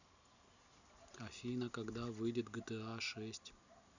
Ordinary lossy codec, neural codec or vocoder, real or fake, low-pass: none; none; real; 7.2 kHz